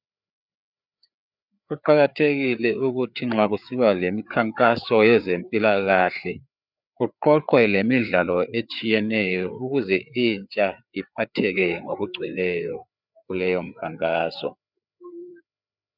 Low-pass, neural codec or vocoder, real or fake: 5.4 kHz; codec, 16 kHz, 4 kbps, FreqCodec, larger model; fake